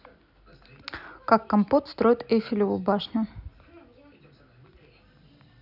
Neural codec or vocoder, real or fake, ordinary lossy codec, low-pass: none; real; none; 5.4 kHz